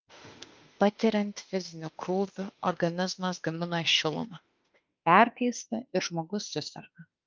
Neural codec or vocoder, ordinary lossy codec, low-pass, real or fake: autoencoder, 48 kHz, 32 numbers a frame, DAC-VAE, trained on Japanese speech; Opus, 24 kbps; 7.2 kHz; fake